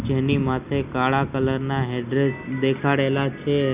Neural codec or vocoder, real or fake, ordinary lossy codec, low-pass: none; real; Opus, 64 kbps; 3.6 kHz